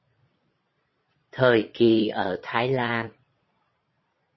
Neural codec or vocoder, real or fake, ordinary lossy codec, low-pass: vocoder, 22.05 kHz, 80 mel bands, Vocos; fake; MP3, 24 kbps; 7.2 kHz